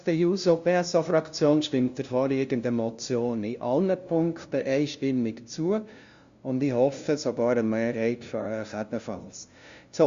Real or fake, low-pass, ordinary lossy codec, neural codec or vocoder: fake; 7.2 kHz; Opus, 64 kbps; codec, 16 kHz, 0.5 kbps, FunCodec, trained on LibriTTS, 25 frames a second